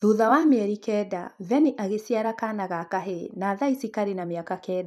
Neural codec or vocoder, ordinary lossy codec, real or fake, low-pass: vocoder, 44.1 kHz, 128 mel bands every 256 samples, BigVGAN v2; none; fake; 14.4 kHz